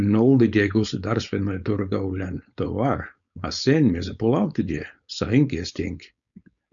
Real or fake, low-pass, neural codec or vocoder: fake; 7.2 kHz; codec, 16 kHz, 4.8 kbps, FACodec